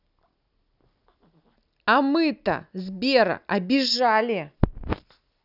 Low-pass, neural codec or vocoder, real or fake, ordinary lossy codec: 5.4 kHz; none; real; none